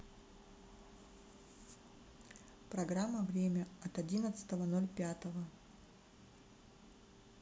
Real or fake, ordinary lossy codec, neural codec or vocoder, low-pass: real; none; none; none